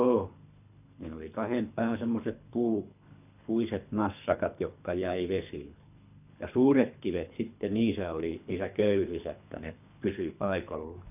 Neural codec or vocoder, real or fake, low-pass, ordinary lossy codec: codec, 24 kHz, 3 kbps, HILCodec; fake; 3.6 kHz; none